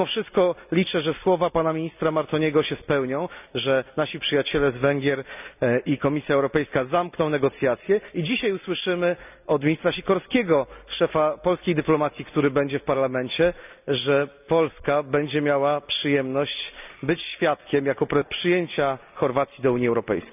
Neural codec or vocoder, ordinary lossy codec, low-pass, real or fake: none; none; 3.6 kHz; real